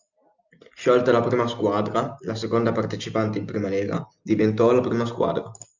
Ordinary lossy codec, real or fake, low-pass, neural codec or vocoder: Opus, 64 kbps; real; 7.2 kHz; none